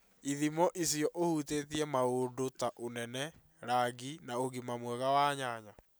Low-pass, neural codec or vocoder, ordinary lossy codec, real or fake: none; none; none; real